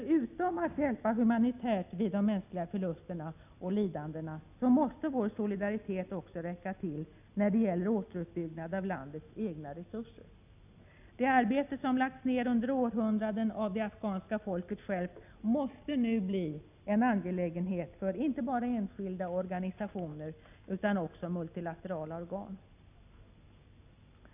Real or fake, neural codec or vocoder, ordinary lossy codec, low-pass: real; none; none; 3.6 kHz